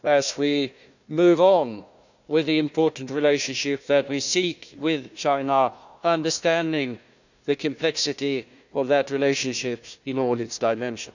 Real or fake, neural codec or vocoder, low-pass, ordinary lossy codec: fake; codec, 16 kHz, 1 kbps, FunCodec, trained on Chinese and English, 50 frames a second; 7.2 kHz; none